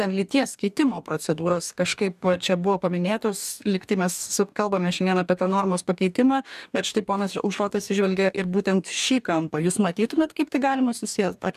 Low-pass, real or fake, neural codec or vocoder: 14.4 kHz; fake; codec, 44.1 kHz, 2.6 kbps, DAC